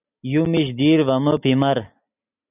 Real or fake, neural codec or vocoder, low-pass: real; none; 3.6 kHz